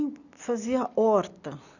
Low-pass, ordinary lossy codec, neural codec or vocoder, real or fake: 7.2 kHz; none; none; real